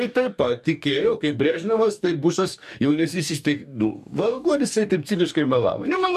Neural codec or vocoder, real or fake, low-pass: codec, 44.1 kHz, 2.6 kbps, DAC; fake; 14.4 kHz